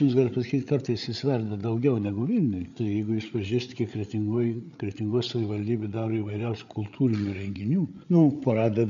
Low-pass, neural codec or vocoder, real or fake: 7.2 kHz; codec, 16 kHz, 8 kbps, FreqCodec, larger model; fake